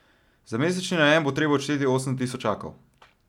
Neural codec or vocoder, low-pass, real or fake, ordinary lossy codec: none; 19.8 kHz; real; none